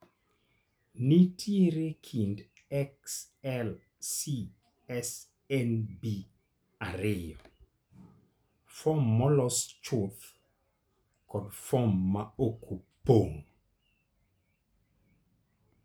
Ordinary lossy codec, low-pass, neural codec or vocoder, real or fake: none; none; none; real